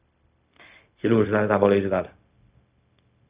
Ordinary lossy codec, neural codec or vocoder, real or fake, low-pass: Opus, 64 kbps; codec, 16 kHz, 0.4 kbps, LongCat-Audio-Codec; fake; 3.6 kHz